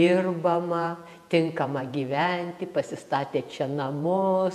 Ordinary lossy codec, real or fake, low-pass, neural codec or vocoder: MP3, 96 kbps; fake; 14.4 kHz; vocoder, 48 kHz, 128 mel bands, Vocos